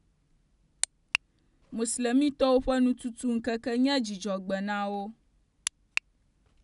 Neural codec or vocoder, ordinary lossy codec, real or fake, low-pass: none; none; real; 10.8 kHz